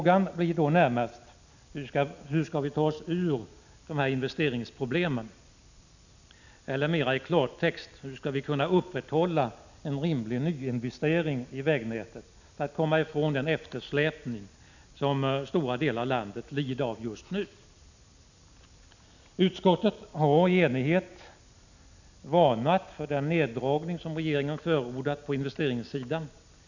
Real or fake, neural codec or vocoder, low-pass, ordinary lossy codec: real; none; 7.2 kHz; none